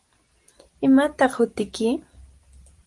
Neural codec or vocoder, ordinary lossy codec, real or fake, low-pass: none; Opus, 24 kbps; real; 10.8 kHz